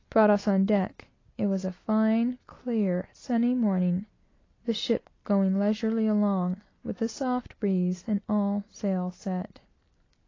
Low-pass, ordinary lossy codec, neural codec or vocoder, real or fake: 7.2 kHz; AAC, 32 kbps; none; real